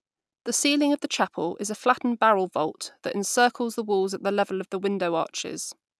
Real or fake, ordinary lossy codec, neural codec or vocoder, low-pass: real; none; none; none